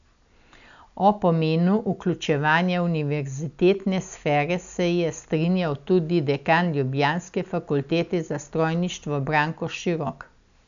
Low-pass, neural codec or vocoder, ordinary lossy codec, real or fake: 7.2 kHz; none; none; real